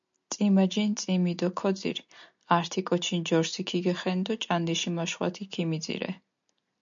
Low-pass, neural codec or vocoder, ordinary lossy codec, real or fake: 7.2 kHz; none; MP3, 64 kbps; real